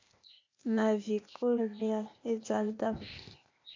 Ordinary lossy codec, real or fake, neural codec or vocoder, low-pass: MP3, 48 kbps; fake; codec, 16 kHz, 0.8 kbps, ZipCodec; 7.2 kHz